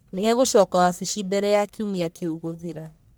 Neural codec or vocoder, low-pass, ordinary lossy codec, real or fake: codec, 44.1 kHz, 1.7 kbps, Pupu-Codec; none; none; fake